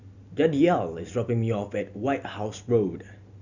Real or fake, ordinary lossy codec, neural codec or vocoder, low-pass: real; none; none; 7.2 kHz